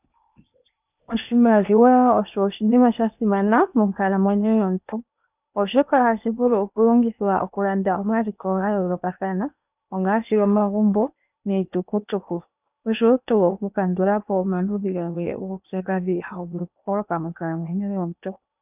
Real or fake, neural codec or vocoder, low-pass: fake; codec, 16 kHz in and 24 kHz out, 0.8 kbps, FocalCodec, streaming, 65536 codes; 3.6 kHz